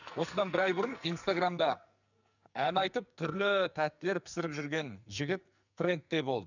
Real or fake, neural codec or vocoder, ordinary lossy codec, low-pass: fake; codec, 44.1 kHz, 2.6 kbps, SNAC; none; 7.2 kHz